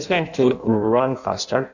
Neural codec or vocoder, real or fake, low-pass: codec, 16 kHz in and 24 kHz out, 0.6 kbps, FireRedTTS-2 codec; fake; 7.2 kHz